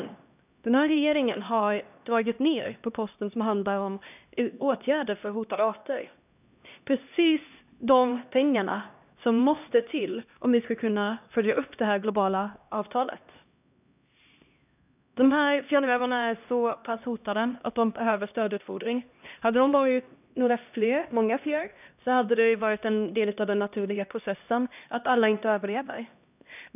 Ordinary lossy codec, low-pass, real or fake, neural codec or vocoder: none; 3.6 kHz; fake; codec, 16 kHz, 1 kbps, X-Codec, HuBERT features, trained on LibriSpeech